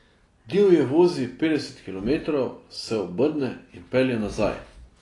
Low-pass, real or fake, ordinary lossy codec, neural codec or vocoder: 10.8 kHz; real; AAC, 32 kbps; none